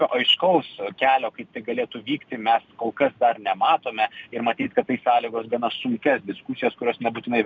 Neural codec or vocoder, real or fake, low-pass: none; real; 7.2 kHz